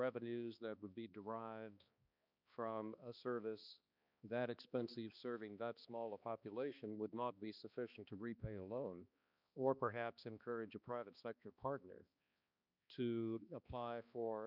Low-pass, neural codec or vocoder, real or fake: 5.4 kHz; codec, 16 kHz, 2 kbps, X-Codec, HuBERT features, trained on balanced general audio; fake